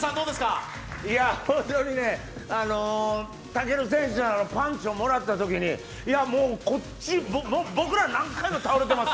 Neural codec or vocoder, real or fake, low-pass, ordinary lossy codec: none; real; none; none